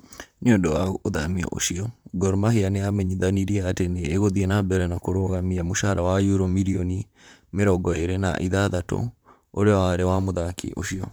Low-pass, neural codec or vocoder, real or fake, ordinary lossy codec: none; vocoder, 44.1 kHz, 128 mel bands, Pupu-Vocoder; fake; none